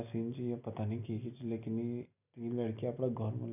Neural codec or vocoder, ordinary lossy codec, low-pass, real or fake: none; none; 3.6 kHz; real